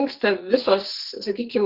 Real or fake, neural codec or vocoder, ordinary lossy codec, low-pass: fake; codec, 44.1 kHz, 2.6 kbps, SNAC; Opus, 16 kbps; 5.4 kHz